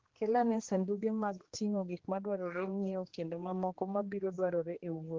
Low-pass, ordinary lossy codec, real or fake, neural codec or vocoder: 7.2 kHz; Opus, 16 kbps; fake; codec, 16 kHz, 2 kbps, X-Codec, HuBERT features, trained on general audio